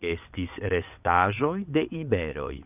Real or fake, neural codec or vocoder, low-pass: fake; vocoder, 22.05 kHz, 80 mel bands, WaveNeXt; 3.6 kHz